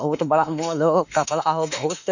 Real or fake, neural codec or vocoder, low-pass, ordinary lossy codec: fake; autoencoder, 48 kHz, 32 numbers a frame, DAC-VAE, trained on Japanese speech; 7.2 kHz; none